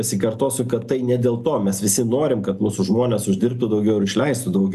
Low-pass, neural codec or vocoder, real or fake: 14.4 kHz; none; real